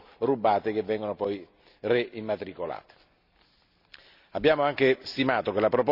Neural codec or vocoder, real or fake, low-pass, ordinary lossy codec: none; real; 5.4 kHz; Opus, 64 kbps